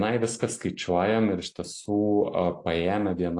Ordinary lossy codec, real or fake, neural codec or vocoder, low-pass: AAC, 48 kbps; real; none; 10.8 kHz